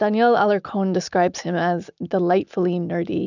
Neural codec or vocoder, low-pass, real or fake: none; 7.2 kHz; real